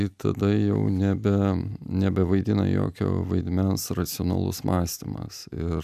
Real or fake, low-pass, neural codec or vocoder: real; 14.4 kHz; none